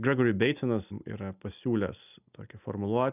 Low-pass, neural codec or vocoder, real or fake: 3.6 kHz; none; real